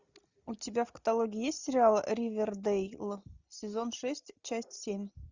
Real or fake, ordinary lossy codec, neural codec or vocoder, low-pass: real; Opus, 64 kbps; none; 7.2 kHz